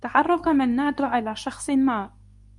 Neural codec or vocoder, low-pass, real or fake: codec, 24 kHz, 0.9 kbps, WavTokenizer, medium speech release version 2; 10.8 kHz; fake